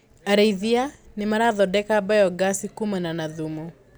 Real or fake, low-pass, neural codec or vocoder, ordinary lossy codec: real; none; none; none